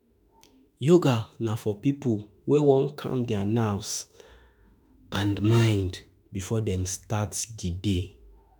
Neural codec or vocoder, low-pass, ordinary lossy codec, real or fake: autoencoder, 48 kHz, 32 numbers a frame, DAC-VAE, trained on Japanese speech; none; none; fake